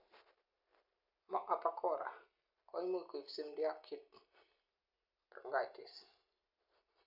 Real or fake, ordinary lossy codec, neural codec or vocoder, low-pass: real; none; none; 5.4 kHz